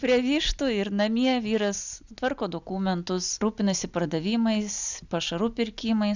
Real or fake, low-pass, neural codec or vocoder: real; 7.2 kHz; none